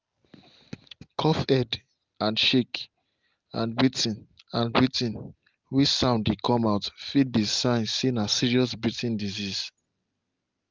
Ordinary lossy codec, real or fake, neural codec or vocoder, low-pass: Opus, 32 kbps; real; none; 7.2 kHz